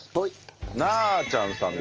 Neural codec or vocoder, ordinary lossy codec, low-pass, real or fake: none; Opus, 24 kbps; 7.2 kHz; real